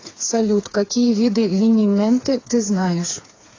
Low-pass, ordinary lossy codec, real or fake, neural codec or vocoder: 7.2 kHz; AAC, 32 kbps; fake; codec, 16 kHz, 4 kbps, FreqCodec, smaller model